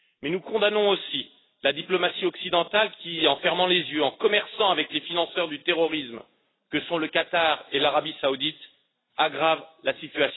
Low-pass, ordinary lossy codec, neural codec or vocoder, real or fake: 7.2 kHz; AAC, 16 kbps; none; real